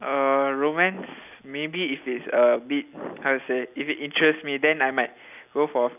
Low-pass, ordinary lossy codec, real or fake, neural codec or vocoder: 3.6 kHz; none; real; none